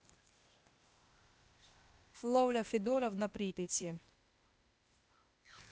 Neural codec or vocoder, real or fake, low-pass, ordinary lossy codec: codec, 16 kHz, 0.8 kbps, ZipCodec; fake; none; none